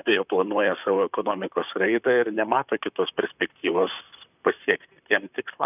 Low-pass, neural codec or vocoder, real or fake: 3.6 kHz; vocoder, 44.1 kHz, 128 mel bands, Pupu-Vocoder; fake